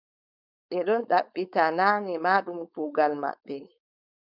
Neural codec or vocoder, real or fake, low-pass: codec, 16 kHz, 4.8 kbps, FACodec; fake; 5.4 kHz